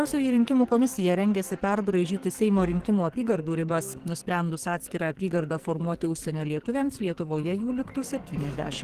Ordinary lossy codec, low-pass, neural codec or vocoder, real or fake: Opus, 16 kbps; 14.4 kHz; codec, 44.1 kHz, 2.6 kbps, SNAC; fake